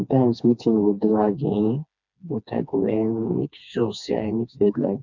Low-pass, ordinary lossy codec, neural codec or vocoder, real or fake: 7.2 kHz; none; codec, 16 kHz, 2 kbps, FreqCodec, smaller model; fake